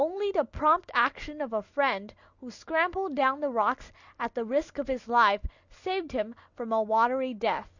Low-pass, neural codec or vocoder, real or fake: 7.2 kHz; none; real